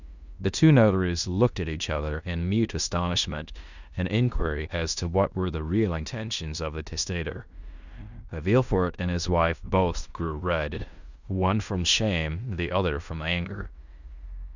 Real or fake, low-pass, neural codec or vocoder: fake; 7.2 kHz; codec, 16 kHz in and 24 kHz out, 0.9 kbps, LongCat-Audio-Codec, fine tuned four codebook decoder